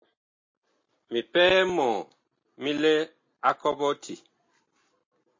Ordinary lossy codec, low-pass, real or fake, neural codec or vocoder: MP3, 32 kbps; 7.2 kHz; real; none